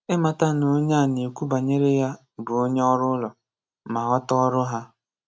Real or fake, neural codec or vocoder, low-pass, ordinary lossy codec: real; none; none; none